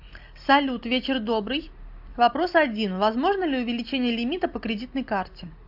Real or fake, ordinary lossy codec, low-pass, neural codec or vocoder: real; AAC, 48 kbps; 5.4 kHz; none